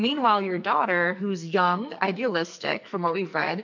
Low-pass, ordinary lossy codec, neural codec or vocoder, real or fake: 7.2 kHz; MP3, 64 kbps; codec, 44.1 kHz, 2.6 kbps, SNAC; fake